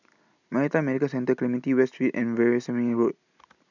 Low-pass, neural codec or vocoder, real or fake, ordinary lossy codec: 7.2 kHz; none; real; none